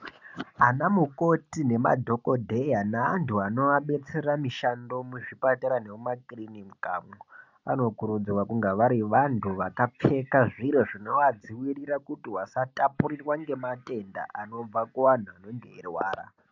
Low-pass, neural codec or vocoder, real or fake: 7.2 kHz; none; real